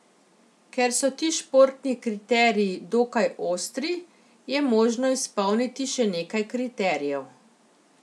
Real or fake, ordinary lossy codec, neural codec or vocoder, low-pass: real; none; none; none